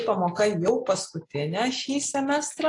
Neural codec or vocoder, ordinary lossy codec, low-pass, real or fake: none; AAC, 48 kbps; 10.8 kHz; real